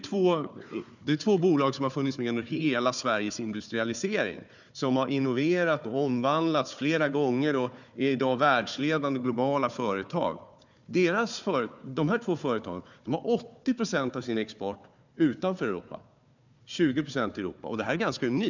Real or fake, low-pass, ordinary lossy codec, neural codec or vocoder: fake; 7.2 kHz; none; codec, 16 kHz, 4 kbps, FunCodec, trained on Chinese and English, 50 frames a second